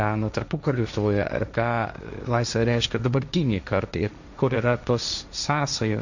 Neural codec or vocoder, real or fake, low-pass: codec, 16 kHz, 1.1 kbps, Voila-Tokenizer; fake; 7.2 kHz